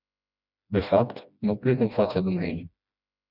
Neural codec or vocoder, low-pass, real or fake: codec, 16 kHz, 1 kbps, FreqCodec, smaller model; 5.4 kHz; fake